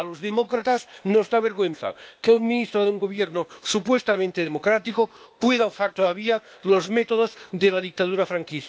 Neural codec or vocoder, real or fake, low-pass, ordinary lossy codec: codec, 16 kHz, 0.8 kbps, ZipCodec; fake; none; none